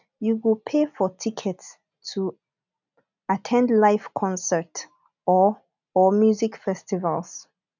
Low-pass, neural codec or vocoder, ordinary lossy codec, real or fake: 7.2 kHz; none; none; real